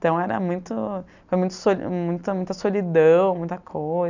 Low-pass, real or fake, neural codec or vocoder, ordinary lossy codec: 7.2 kHz; real; none; none